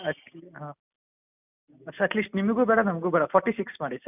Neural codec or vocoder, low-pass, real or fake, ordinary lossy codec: none; 3.6 kHz; real; none